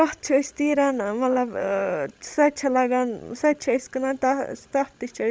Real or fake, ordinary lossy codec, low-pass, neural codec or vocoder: fake; none; none; codec, 16 kHz, 16 kbps, FreqCodec, larger model